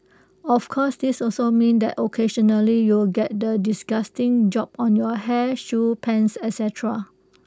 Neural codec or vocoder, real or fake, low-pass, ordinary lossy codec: none; real; none; none